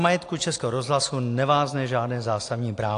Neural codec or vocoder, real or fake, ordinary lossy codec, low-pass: none; real; AAC, 48 kbps; 10.8 kHz